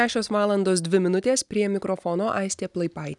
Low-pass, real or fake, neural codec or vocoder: 10.8 kHz; real; none